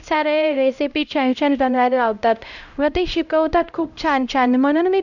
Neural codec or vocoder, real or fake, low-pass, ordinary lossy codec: codec, 16 kHz, 0.5 kbps, X-Codec, HuBERT features, trained on LibriSpeech; fake; 7.2 kHz; none